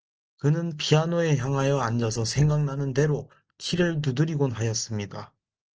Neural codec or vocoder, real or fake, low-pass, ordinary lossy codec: none; real; 7.2 kHz; Opus, 16 kbps